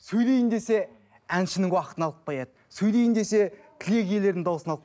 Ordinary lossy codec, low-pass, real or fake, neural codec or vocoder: none; none; real; none